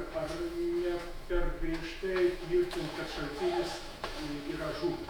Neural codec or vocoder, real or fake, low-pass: autoencoder, 48 kHz, 128 numbers a frame, DAC-VAE, trained on Japanese speech; fake; 19.8 kHz